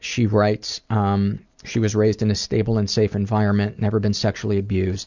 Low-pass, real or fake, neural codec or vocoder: 7.2 kHz; real; none